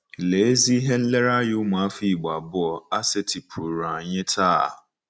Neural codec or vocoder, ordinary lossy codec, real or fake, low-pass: none; none; real; none